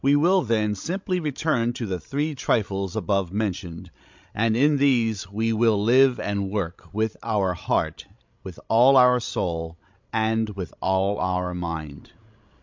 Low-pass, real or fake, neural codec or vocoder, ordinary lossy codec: 7.2 kHz; fake; codec, 16 kHz, 16 kbps, FreqCodec, larger model; MP3, 64 kbps